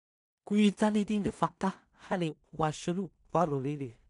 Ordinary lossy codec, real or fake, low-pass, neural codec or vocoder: none; fake; 10.8 kHz; codec, 16 kHz in and 24 kHz out, 0.4 kbps, LongCat-Audio-Codec, two codebook decoder